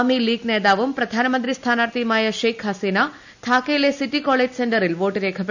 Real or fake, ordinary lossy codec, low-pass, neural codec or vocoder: real; none; 7.2 kHz; none